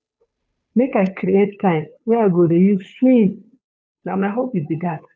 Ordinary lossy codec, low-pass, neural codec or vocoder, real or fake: none; none; codec, 16 kHz, 2 kbps, FunCodec, trained on Chinese and English, 25 frames a second; fake